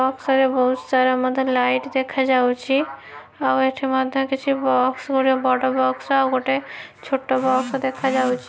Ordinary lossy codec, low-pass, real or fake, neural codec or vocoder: none; none; real; none